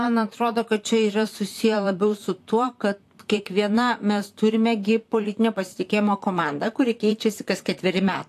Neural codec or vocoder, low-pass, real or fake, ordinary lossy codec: vocoder, 44.1 kHz, 128 mel bands, Pupu-Vocoder; 14.4 kHz; fake; AAC, 64 kbps